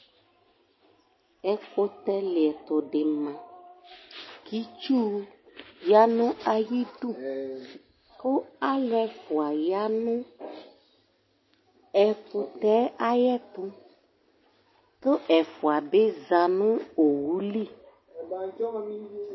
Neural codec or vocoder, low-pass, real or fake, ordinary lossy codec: none; 7.2 kHz; real; MP3, 24 kbps